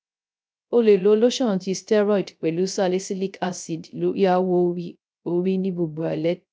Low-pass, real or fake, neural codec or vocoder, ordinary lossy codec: none; fake; codec, 16 kHz, 0.3 kbps, FocalCodec; none